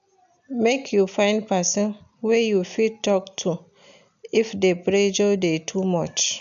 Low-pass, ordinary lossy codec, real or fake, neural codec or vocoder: 7.2 kHz; none; real; none